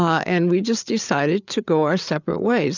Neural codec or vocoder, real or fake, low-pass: codec, 16 kHz, 8 kbps, FreqCodec, larger model; fake; 7.2 kHz